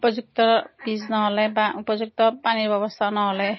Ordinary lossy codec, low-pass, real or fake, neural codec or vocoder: MP3, 24 kbps; 7.2 kHz; real; none